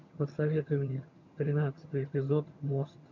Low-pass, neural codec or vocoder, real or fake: 7.2 kHz; vocoder, 22.05 kHz, 80 mel bands, HiFi-GAN; fake